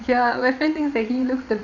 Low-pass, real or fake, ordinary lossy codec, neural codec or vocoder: 7.2 kHz; fake; none; vocoder, 22.05 kHz, 80 mel bands, Vocos